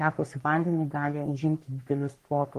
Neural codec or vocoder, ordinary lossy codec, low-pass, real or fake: codec, 44.1 kHz, 3.4 kbps, Pupu-Codec; Opus, 24 kbps; 14.4 kHz; fake